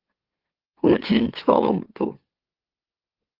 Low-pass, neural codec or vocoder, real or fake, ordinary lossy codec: 5.4 kHz; autoencoder, 44.1 kHz, a latent of 192 numbers a frame, MeloTTS; fake; Opus, 16 kbps